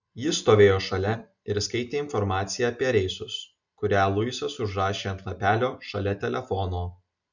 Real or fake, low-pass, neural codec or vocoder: real; 7.2 kHz; none